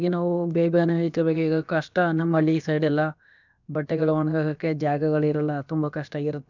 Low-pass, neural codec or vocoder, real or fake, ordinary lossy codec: 7.2 kHz; codec, 16 kHz, about 1 kbps, DyCAST, with the encoder's durations; fake; none